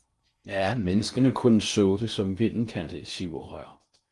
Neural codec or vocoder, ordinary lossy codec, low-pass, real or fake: codec, 16 kHz in and 24 kHz out, 0.6 kbps, FocalCodec, streaming, 4096 codes; Opus, 32 kbps; 10.8 kHz; fake